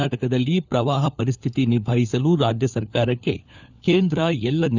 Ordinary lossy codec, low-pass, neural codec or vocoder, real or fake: none; 7.2 kHz; codec, 16 kHz, 4 kbps, FunCodec, trained on LibriTTS, 50 frames a second; fake